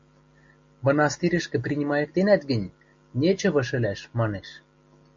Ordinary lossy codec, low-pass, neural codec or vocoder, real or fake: MP3, 48 kbps; 7.2 kHz; none; real